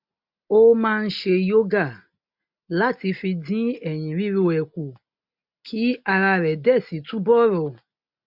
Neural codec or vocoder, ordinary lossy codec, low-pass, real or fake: none; MP3, 48 kbps; 5.4 kHz; real